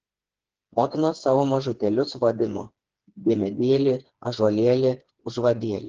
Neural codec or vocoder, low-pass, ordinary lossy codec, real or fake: codec, 16 kHz, 4 kbps, FreqCodec, smaller model; 7.2 kHz; Opus, 16 kbps; fake